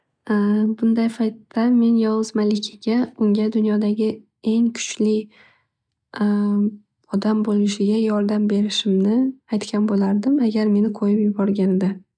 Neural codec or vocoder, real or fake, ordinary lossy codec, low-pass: none; real; none; 9.9 kHz